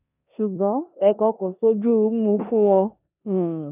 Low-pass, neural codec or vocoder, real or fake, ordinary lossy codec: 3.6 kHz; codec, 16 kHz in and 24 kHz out, 0.9 kbps, LongCat-Audio-Codec, four codebook decoder; fake; none